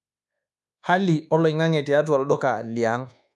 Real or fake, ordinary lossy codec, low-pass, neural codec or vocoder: fake; none; none; codec, 24 kHz, 1.2 kbps, DualCodec